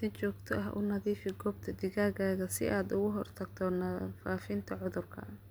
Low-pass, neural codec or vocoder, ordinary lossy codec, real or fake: none; none; none; real